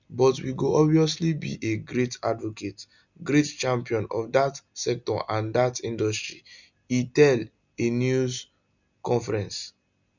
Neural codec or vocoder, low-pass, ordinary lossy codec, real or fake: none; 7.2 kHz; none; real